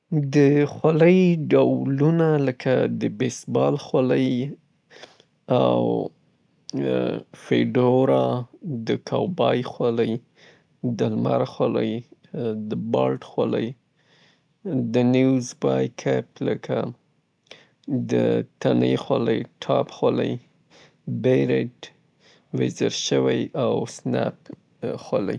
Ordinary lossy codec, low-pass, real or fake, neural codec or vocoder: none; 9.9 kHz; real; none